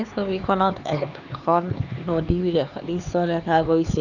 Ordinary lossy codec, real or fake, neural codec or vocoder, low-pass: none; fake; codec, 16 kHz, 4 kbps, X-Codec, HuBERT features, trained on LibriSpeech; 7.2 kHz